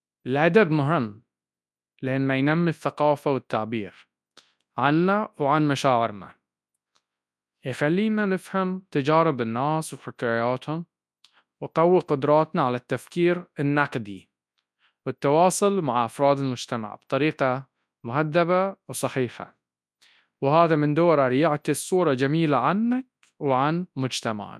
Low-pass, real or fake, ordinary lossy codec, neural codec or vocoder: none; fake; none; codec, 24 kHz, 0.9 kbps, WavTokenizer, large speech release